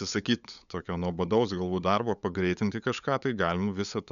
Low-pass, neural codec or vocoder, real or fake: 7.2 kHz; codec, 16 kHz, 8 kbps, FunCodec, trained on LibriTTS, 25 frames a second; fake